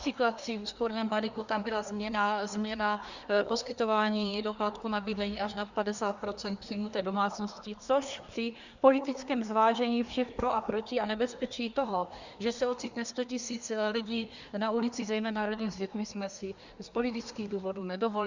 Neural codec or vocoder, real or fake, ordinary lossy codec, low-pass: codec, 24 kHz, 1 kbps, SNAC; fake; Opus, 64 kbps; 7.2 kHz